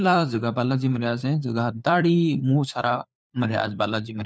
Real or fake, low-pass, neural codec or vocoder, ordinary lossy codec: fake; none; codec, 16 kHz, 4 kbps, FunCodec, trained on LibriTTS, 50 frames a second; none